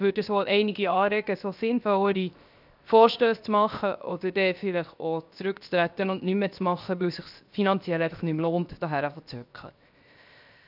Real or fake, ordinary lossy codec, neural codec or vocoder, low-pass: fake; none; codec, 16 kHz, 0.7 kbps, FocalCodec; 5.4 kHz